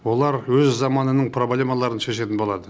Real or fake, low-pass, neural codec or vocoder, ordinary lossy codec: real; none; none; none